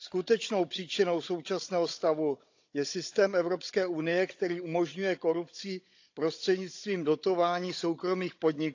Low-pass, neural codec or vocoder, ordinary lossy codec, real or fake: 7.2 kHz; codec, 16 kHz, 16 kbps, FunCodec, trained on Chinese and English, 50 frames a second; AAC, 48 kbps; fake